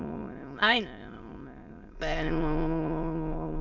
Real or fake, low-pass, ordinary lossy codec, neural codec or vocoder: fake; 7.2 kHz; none; autoencoder, 22.05 kHz, a latent of 192 numbers a frame, VITS, trained on many speakers